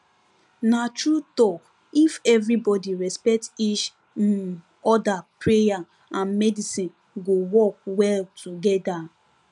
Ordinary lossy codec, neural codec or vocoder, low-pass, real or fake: none; none; 10.8 kHz; real